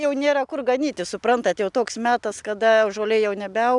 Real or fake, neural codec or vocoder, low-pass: real; none; 10.8 kHz